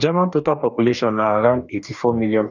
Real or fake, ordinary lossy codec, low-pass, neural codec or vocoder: fake; none; 7.2 kHz; codec, 44.1 kHz, 2.6 kbps, DAC